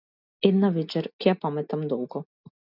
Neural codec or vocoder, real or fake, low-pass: none; real; 5.4 kHz